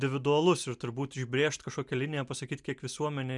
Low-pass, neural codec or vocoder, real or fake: 10.8 kHz; none; real